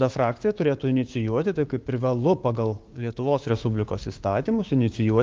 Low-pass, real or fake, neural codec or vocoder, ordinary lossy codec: 7.2 kHz; fake; codec, 16 kHz, 6 kbps, DAC; Opus, 32 kbps